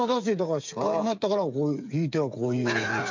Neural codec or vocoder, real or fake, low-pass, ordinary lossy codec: codec, 16 kHz, 8 kbps, FreqCodec, smaller model; fake; 7.2 kHz; MP3, 64 kbps